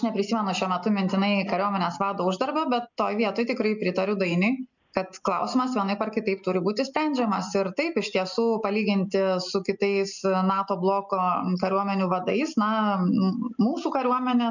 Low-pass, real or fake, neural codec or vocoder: 7.2 kHz; real; none